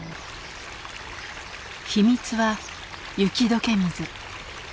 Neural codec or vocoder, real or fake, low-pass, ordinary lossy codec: none; real; none; none